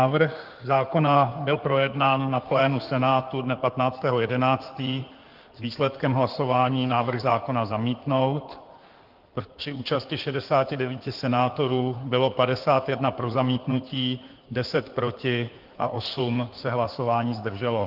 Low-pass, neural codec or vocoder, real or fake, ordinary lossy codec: 5.4 kHz; codec, 16 kHz in and 24 kHz out, 2.2 kbps, FireRedTTS-2 codec; fake; Opus, 32 kbps